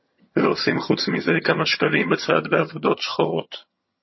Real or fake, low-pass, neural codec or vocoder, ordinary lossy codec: fake; 7.2 kHz; vocoder, 22.05 kHz, 80 mel bands, HiFi-GAN; MP3, 24 kbps